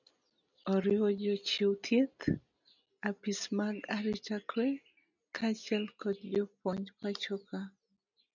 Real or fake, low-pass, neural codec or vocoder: real; 7.2 kHz; none